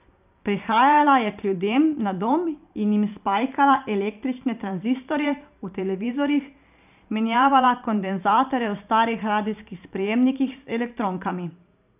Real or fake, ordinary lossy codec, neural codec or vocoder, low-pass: fake; none; vocoder, 44.1 kHz, 128 mel bands every 512 samples, BigVGAN v2; 3.6 kHz